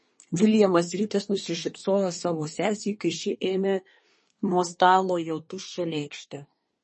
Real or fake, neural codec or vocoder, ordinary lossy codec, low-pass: fake; codec, 24 kHz, 1 kbps, SNAC; MP3, 32 kbps; 10.8 kHz